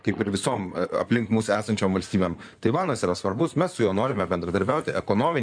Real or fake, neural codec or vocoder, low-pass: fake; codec, 16 kHz in and 24 kHz out, 2.2 kbps, FireRedTTS-2 codec; 9.9 kHz